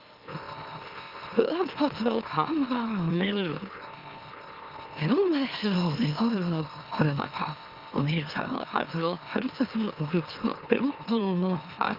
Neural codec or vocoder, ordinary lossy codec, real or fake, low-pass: autoencoder, 44.1 kHz, a latent of 192 numbers a frame, MeloTTS; Opus, 24 kbps; fake; 5.4 kHz